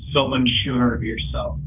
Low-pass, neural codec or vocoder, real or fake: 3.6 kHz; codec, 16 kHz, 1.1 kbps, Voila-Tokenizer; fake